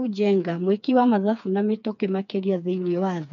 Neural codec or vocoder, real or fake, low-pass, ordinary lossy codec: codec, 16 kHz, 4 kbps, FreqCodec, smaller model; fake; 7.2 kHz; none